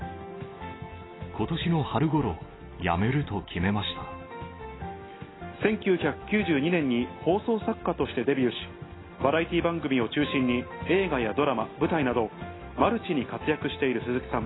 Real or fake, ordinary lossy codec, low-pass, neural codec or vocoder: real; AAC, 16 kbps; 7.2 kHz; none